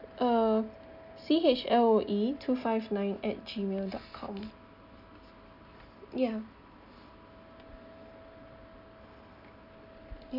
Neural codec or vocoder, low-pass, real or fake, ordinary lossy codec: none; 5.4 kHz; real; none